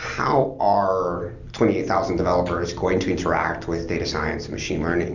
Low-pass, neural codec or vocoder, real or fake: 7.2 kHz; none; real